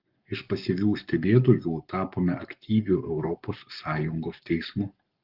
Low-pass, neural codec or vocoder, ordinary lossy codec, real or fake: 5.4 kHz; codec, 44.1 kHz, 7.8 kbps, Pupu-Codec; Opus, 32 kbps; fake